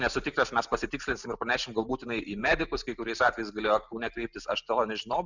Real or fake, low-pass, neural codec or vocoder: real; 7.2 kHz; none